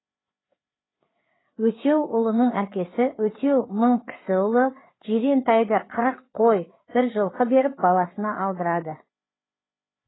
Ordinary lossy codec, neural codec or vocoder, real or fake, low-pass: AAC, 16 kbps; codec, 16 kHz, 4 kbps, FreqCodec, larger model; fake; 7.2 kHz